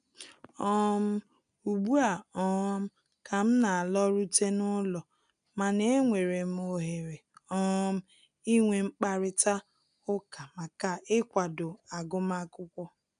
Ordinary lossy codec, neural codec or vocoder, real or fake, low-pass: none; none; real; 9.9 kHz